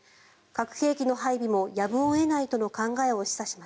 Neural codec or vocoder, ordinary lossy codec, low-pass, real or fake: none; none; none; real